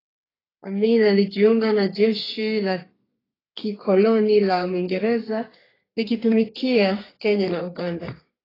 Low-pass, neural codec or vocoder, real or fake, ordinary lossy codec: 5.4 kHz; codec, 32 kHz, 1.9 kbps, SNAC; fake; AAC, 24 kbps